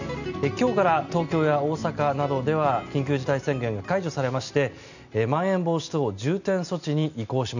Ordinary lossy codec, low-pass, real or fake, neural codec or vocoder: none; 7.2 kHz; real; none